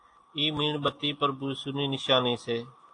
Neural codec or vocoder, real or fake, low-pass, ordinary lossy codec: none; real; 9.9 kHz; AAC, 48 kbps